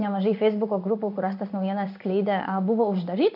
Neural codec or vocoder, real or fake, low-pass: codec, 16 kHz in and 24 kHz out, 1 kbps, XY-Tokenizer; fake; 5.4 kHz